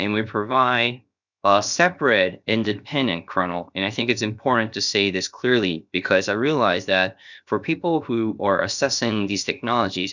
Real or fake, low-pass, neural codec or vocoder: fake; 7.2 kHz; codec, 16 kHz, 0.7 kbps, FocalCodec